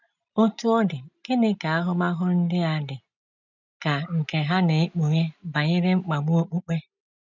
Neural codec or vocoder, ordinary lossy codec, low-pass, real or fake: none; none; 7.2 kHz; real